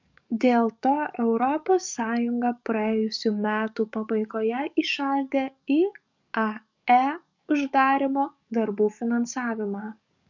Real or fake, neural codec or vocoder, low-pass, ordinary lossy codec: fake; codec, 44.1 kHz, 7.8 kbps, DAC; 7.2 kHz; MP3, 64 kbps